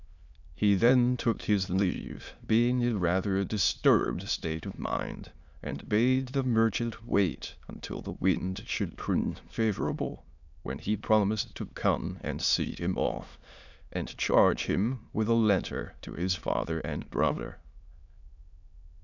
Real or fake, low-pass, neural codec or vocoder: fake; 7.2 kHz; autoencoder, 22.05 kHz, a latent of 192 numbers a frame, VITS, trained on many speakers